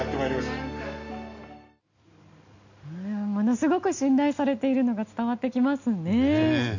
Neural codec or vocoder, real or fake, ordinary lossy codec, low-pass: none; real; none; 7.2 kHz